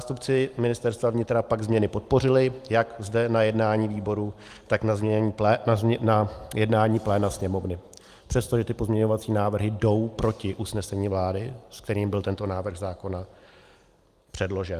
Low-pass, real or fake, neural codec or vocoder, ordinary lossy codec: 14.4 kHz; real; none; Opus, 32 kbps